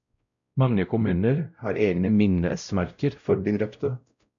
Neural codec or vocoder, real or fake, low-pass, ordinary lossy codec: codec, 16 kHz, 0.5 kbps, X-Codec, WavLM features, trained on Multilingual LibriSpeech; fake; 7.2 kHz; MP3, 96 kbps